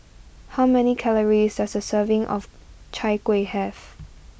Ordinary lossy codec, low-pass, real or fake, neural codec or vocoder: none; none; real; none